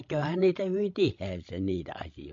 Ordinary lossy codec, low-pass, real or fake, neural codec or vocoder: MP3, 48 kbps; 7.2 kHz; fake; codec, 16 kHz, 16 kbps, FreqCodec, larger model